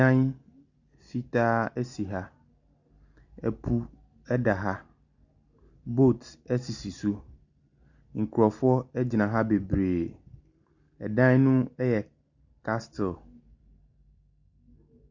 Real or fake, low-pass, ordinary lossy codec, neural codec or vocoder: real; 7.2 kHz; Opus, 64 kbps; none